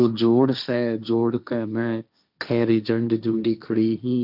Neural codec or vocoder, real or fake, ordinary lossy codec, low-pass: codec, 16 kHz, 1.1 kbps, Voila-Tokenizer; fake; none; 5.4 kHz